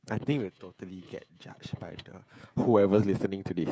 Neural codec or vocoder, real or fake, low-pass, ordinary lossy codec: codec, 16 kHz, 16 kbps, FreqCodec, smaller model; fake; none; none